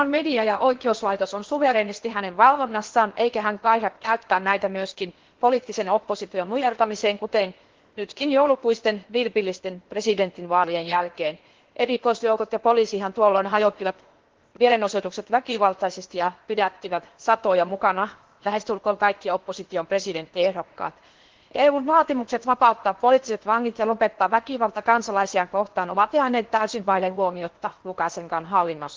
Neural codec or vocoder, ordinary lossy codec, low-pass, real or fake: codec, 16 kHz in and 24 kHz out, 0.8 kbps, FocalCodec, streaming, 65536 codes; Opus, 16 kbps; 7.2 kHz; fake